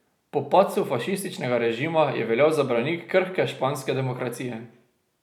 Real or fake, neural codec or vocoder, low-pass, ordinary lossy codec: real; none; 19.8 kHz; none